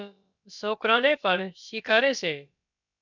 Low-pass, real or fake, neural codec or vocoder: 7.2 kHz; fake; codec, 16 kHz, about 1 kbps, DyCAST, with the encoder's durations